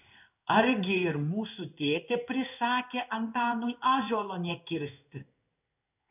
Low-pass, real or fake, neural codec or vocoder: 3.6 kHz; fake; codec, 16 kHz in and 24 kHz out, 1 kbps, XY-Tokenizer